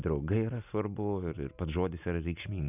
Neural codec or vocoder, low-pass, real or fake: none; 3.6 kHz; real